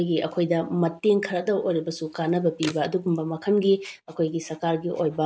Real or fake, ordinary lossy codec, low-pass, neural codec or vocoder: real; none; none; none